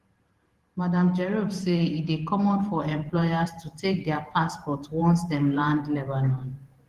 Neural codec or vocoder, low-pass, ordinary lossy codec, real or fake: vocoder, 44.1 kHz, 128 mel bands every 512 samples, BigVGAN v2; 14.4 kHz; Opus, 16 kbps; fake